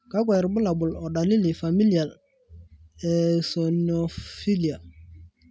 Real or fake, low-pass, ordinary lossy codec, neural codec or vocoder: real; none; none; none